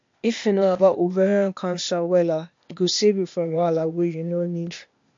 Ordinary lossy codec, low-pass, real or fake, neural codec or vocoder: MP3, 48 kbps; 7.2 kHz; fake; codec, 16 kHz, 0.8 kbps, ZipCodec